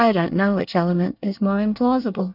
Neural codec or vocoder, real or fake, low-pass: codec, 24 kHz, 1 kbps, SNAC; fake; 5.4 kHz